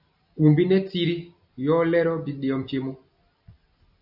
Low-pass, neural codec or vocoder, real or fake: 5.4 kHz; none; real